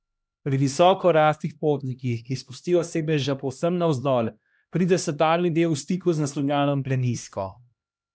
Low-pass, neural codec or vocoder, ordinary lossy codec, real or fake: none; codec, 16 kHz, 1 kbps, X-Codec, HuBERT features, trained on LibriSpeech; none; fake